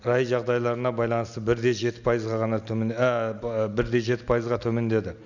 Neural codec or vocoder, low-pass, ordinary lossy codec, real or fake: none; 7.2 kHz; none; real